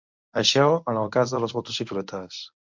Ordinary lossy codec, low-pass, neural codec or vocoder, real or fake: MP3, 64 kbps; 7.2 kHz; codec, 24 kHz, 0.9 kbps, WavTokenizer, medium speech release version 1; fake